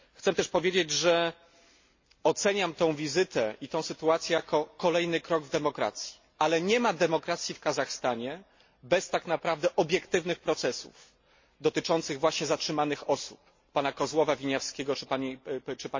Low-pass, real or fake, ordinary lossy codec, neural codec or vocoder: 7.2 kHz; real; MP3, 32 kbps; none